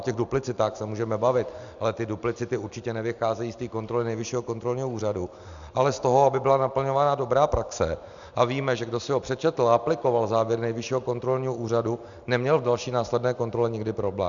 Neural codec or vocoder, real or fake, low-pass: none; real; 7.2 kHz